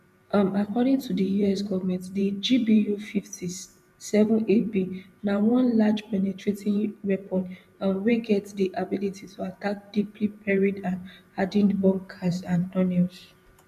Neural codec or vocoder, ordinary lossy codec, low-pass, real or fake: vocoder, 44.1 kHz, 128 mel bands every 512 samples, BigVGAN v2; none; 14.4 kHz; fake